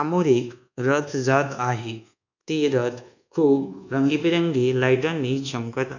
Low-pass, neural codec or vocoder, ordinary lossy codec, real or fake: 7.2 kHz; codec, 24 kHz, 1.2 kbps, DualCodec; none; fake